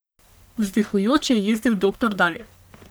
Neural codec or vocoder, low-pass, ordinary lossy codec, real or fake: codec, 44.1 kHz, 1.7 kbps, Pupu-Codec; none; none; fake